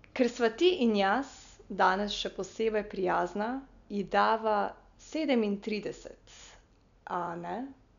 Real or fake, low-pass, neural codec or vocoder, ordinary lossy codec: real; 7.2 kHz; none; none